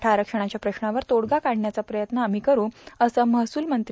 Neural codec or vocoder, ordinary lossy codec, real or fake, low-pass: none; none; real; none